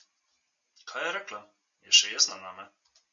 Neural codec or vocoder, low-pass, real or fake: none; 7.2 kHz; real